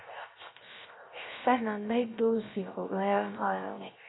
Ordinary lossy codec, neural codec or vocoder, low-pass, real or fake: AAC, 16 kbps; codec, 16 kHz, 0.3 kbps, FocalCodec; 7.2 kHz; fake